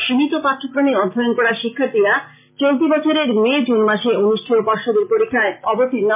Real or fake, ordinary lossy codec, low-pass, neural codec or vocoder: real; MP3, 32 kbps; 3.6 kHz; none